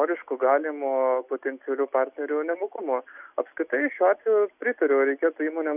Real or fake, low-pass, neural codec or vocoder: real; 3.6 kHz; none